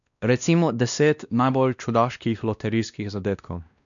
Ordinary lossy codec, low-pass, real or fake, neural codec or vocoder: none; 7.2 kHz; fake; codec, 16 kHz, 1 kbps, X-Codec, WavLM features, trained on Multilingual LibriSpeech